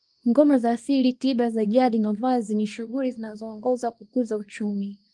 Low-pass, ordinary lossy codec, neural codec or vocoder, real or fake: 10.8 kHz; Opus, 24 kbps; codec, 24 kHz, 0.9 kbps, WavTokenizer, small release; fake